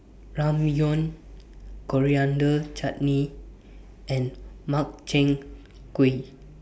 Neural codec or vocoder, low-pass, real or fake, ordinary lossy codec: none; none; real; none